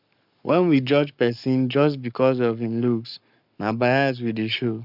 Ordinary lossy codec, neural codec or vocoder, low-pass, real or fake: none; codec, 44.1 kHz, 7.8 kbps, Pupu-Codec; 5.4 kHz; fake